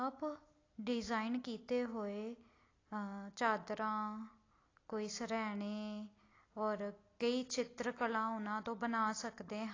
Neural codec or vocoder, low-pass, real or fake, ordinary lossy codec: none; 7.2 kHz; real; AAC, 32 kbps